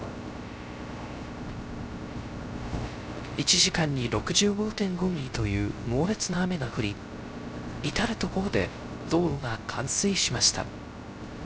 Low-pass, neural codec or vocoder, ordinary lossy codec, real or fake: none; codec, 16 kHz, 0.3 kbps, FocalCodec; none; fake